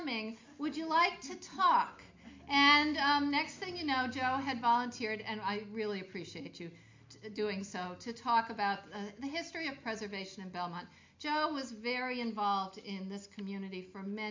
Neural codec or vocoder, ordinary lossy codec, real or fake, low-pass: none; MP3, 48 kbps; real; 7.2 kHz